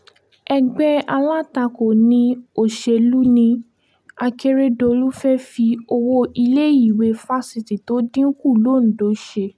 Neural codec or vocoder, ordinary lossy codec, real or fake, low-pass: none; none; real; none